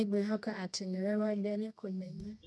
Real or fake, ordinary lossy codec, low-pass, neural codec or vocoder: fake; none; none; codec, 24 kHz, 0.9 kbps, WavTokenizer, medium music audio release